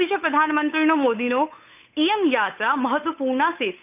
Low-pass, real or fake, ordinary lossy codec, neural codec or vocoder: 3.6 kHz; fake; none; codec, 16 kHz, 8 kbps, FunCodec, trained on Chinese and English, 25 frames a second